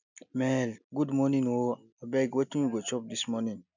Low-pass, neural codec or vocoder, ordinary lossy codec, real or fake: 7.2 kHz; none; none; real